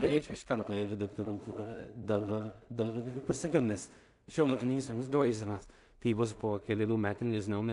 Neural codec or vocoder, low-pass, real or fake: codec, 16 kHz in and 24 kHz out, 0.4 kbps, LongCat-Audio-Codec, two codebook decoder; 10.8 kHz; fake